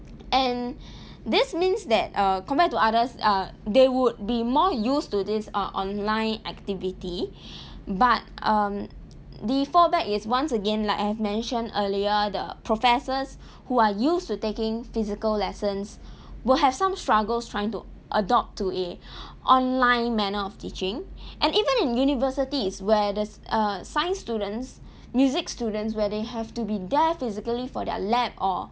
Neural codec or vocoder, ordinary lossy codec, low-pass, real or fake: none; none; none; real